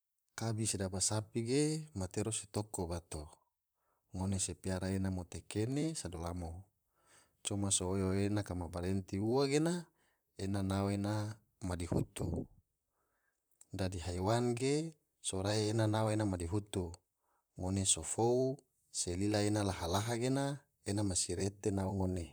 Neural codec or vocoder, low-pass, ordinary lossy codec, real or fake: vocoder, 44.1 kHz, 128 mel bands, Pupu-Vocoder; none; none; fake